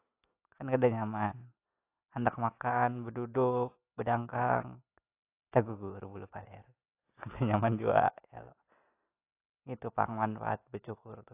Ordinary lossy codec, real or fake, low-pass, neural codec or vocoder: AAC, 24 kbps; real; 3.6 kHz; none